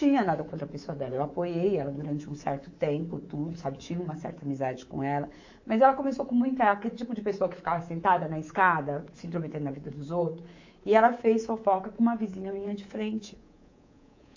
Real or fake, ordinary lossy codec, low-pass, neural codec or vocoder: fake; AAC, 48 kbps; 7.2 kHz; codec, 24 kHz, 3.1 kbps, DualCodec